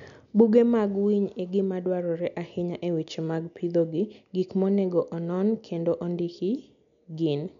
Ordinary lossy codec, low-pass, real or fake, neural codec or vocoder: none; 7.2 kHz; real; none